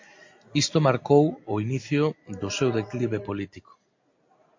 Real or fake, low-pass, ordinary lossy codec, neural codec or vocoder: real; 7.2 kHz; MP3, 48 kbps; none